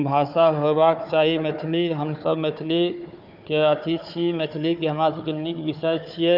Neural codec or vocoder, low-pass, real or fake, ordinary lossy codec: codec, 16 kHz, 4 kbps, FunCodec, trained on Chinese and English, 50 frames a second; 5.4 kHz; fake; none